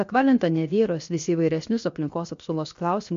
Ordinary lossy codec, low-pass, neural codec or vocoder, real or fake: MP3, 48 kbps; 7.2 kHz; codec, 16 kHz, 0.7 kbps, FocalCodec; fake